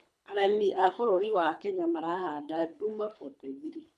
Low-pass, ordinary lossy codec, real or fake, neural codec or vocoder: none; none; fake; codec, 24 kHz, 3 kbps, HILCodec